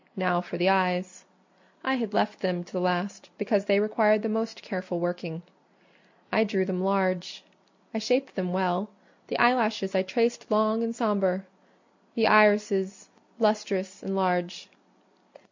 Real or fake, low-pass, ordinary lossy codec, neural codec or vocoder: real; 7.2 kHz; MP3, 64 kbps; none